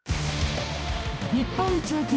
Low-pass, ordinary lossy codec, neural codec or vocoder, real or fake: none; none; codec, 16 kHz, 1 kbps, X-Codec, HuBERT features, trained on balanced general audio; fake